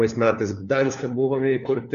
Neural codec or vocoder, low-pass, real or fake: codec, 16 kHz, 4 kbps, FunCodec, trained on LibriTTS, 50 frames a second; 7.2 kHz; fake